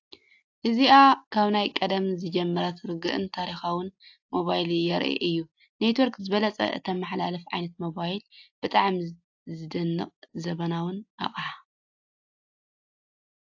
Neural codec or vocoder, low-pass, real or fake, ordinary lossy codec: none; 7.2 kHz; real; AAC, 48 kbps